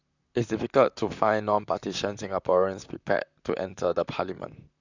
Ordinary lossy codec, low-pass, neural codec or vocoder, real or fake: none; 7.2 kHz; vocoder, 44.1 kHz, 128 mel bands, Pupu-Vocoder; fake